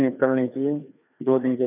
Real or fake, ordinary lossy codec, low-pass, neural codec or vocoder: fake; none; 3.6 kHz; codec, 16 kHz, 2 kbps, FreqCodec, larger model